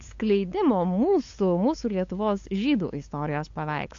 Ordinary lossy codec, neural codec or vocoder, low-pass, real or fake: AAC, 48 kbps; codec, 16 kHz, 8 kbps, FunCodec, trained on LibriTTS, 25 frames a second; 7.2 kHz; fake